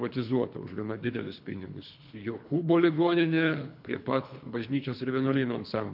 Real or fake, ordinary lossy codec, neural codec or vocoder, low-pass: fake; MP3, 48 kbps; codec, 24 kHz, 3 kbps, HILCodec; 5.4 kHz